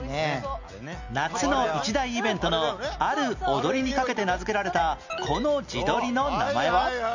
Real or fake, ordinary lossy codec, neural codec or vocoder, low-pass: real; none; none; 7.2 kHz